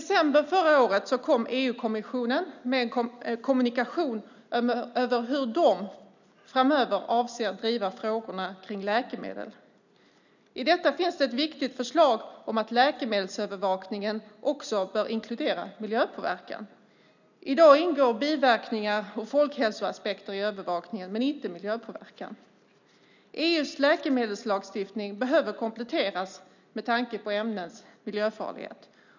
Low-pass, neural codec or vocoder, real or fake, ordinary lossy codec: 7.2 kHz; none; real; none